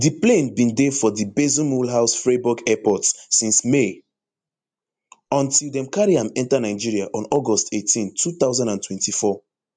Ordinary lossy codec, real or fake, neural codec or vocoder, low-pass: MP3, 64 kbps; real; none; 9.9 kHz